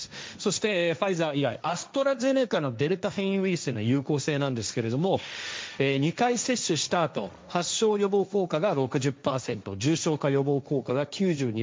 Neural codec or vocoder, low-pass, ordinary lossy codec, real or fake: codec, 16 kHz, 1.1 kbps, Voila-Tokenizer; none; none; fake